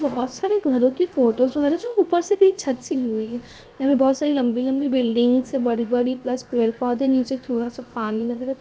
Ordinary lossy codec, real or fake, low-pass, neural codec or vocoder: none; fake; none; codec, 16 kHz, 0.7 kbps, FocalCodec